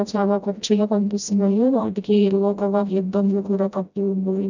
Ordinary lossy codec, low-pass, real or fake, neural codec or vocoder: none; 7.2 kHz; fake; codec, 16 kHz, 0.5 kbps, FreqCodec, smaller model